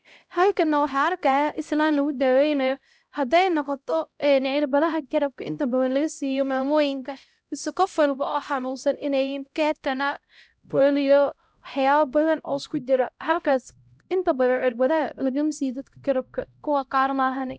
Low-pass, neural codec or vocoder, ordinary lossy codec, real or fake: none; codec, 16 kHz, 0.5 kbps, X-Codec, HuBERT features, trained on LibriSpeech; none; fake